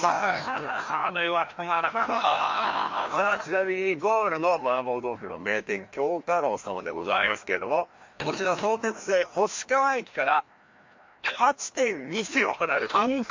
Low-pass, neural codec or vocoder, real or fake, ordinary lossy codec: 7.2 kHz; codec, 16 kHz, 1 kbps, FreqCodec, larger model; fake; MP3, 48 kbps